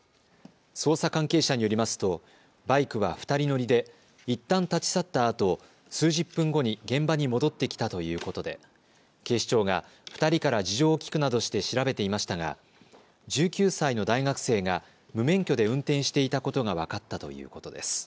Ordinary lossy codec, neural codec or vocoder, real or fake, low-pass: none; none; real; none